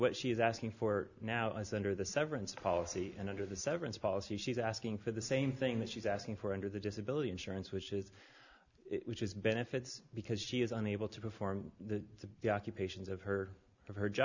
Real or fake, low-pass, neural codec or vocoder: real; 7.2 kHz; none